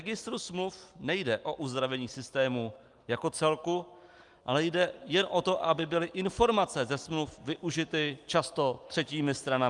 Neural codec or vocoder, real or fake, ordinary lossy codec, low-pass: none; real; Opus, 32 kbps; 10.8 kHz